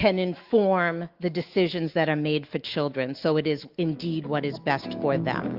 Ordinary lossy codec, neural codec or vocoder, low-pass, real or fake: Opus, 24 kbps; autoencoder, 48 kHz, 128 numbers a frame, DAC-VAE, trained on Japanese speech; 5.4 kHz; fake